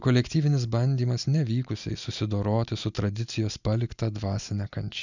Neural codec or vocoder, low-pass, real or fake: none; 7.2 kHz; real